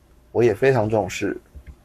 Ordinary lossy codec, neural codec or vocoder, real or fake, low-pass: AAC, 96 kbps; codec, 44.1 kHz, 7.8 kbps, Pupu-Codec; fake; 14.4 kHz